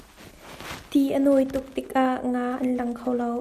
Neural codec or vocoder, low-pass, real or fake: none; 14.4 kHz; real